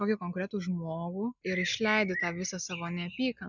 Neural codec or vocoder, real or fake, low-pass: none; real; 7.2 kHz